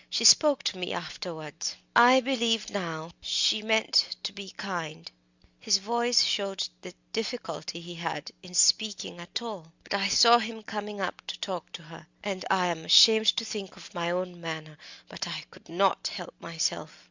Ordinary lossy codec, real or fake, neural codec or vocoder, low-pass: Opus, 64 kbps; real; none; 7.2 kHz